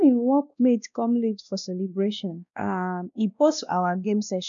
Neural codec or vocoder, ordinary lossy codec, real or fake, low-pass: codec, 16 kHz, 1 kbps, X-Codec, WavLM features, trained on Multilingual LibriSpeech; none; fake; 7.2 kHz